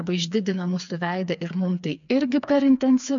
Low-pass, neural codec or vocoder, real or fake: 7.2 kHz; codec, 16 kHz, 4 kbps, FreqCodec, smaller model; fake